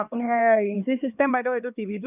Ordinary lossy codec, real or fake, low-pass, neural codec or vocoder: none; fake; 3.6 kHz; codec, 16 kHz, 4 kbps, X-Codec, HuBERT features, trained on LibriSpeech